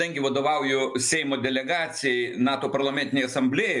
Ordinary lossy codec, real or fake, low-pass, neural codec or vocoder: MP3, 64 kbps; real; 10.8 kHz; none